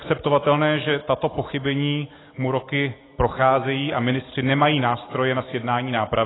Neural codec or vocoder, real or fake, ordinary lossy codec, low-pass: none; real; AAC, 16 kbps; 7.2 kHz